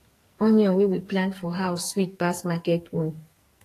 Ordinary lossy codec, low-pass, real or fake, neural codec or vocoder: AAC, 48 kbps; 14.4 kHz; fake; codec, 44.1 kHz, 2.6 kbps, SNAC